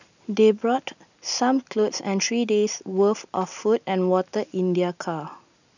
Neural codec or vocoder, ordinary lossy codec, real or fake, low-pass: none; none; real; 7.2 kHz